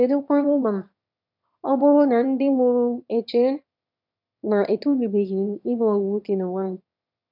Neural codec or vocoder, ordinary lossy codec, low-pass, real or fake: autoencoder, 22.05 kHz, a latent of 192 numbers a frame, VITS, trained on one speaker; none; 5.4 kHz; fake